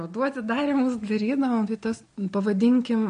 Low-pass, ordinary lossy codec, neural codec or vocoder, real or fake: 9.9 kHz; MP3, 48 kbps; none; real